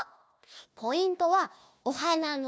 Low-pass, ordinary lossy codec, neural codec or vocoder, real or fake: none; none; codec, 16 kHz, 1 kbps, FunCodec, trained on Chinese and English, 50 frames a second; fake